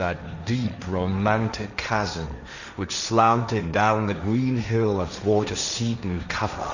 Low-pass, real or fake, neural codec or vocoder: 7.2 kHz; fake; codec, 16 kHz, 1.1 kbps, Voila-Tokenizer